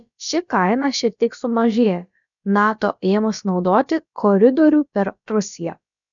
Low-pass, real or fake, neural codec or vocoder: 7.2 kHz; fake; codec, 16 kHz, about 1 kbps, DyCAST, with the encoder's durations